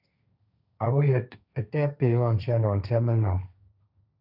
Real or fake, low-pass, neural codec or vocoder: fake; 5.4 kHz; codec, 16 kHz, 1.1 kbps, Voila-Tokenizer